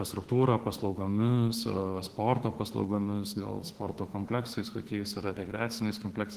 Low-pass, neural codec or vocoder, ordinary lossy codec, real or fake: 14.4 kHz; autoencoder, 48 kHz, 32 numbers a frame, DAC-VAE, trained on Japanese speech; Opus, 16 kbps; fake